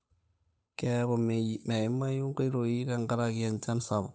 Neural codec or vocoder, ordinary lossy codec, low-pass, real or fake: codec, 16 kHz, 8 kbps, FunCodec, trained on Chinese and English, 25 frames a second; none; none; fake